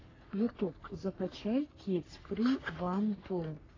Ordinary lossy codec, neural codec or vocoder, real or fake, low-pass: AAC, 32 kbps; codec, 44.1 kHz, 3.4 kbps, Pupu-Codec; fake; 7.2 kHz